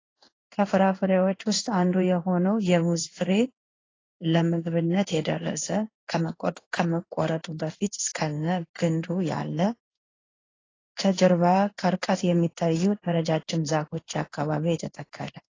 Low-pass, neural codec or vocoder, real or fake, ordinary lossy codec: 7.2 kHz; codec, 16 kHz in and 24 kHz out, 1 kbps, XY-Tokenizer; fake; AAC, 32 kbps